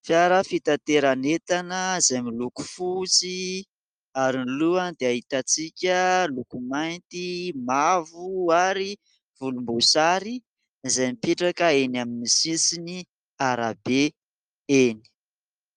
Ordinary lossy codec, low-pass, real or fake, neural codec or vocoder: Opus, 32 kbps; 9.9 kHz; real; none